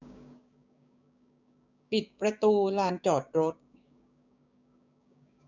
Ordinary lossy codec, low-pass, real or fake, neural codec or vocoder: none; 7.2 kHz; fake; codec, 16 kHz, 6 kbps, DAC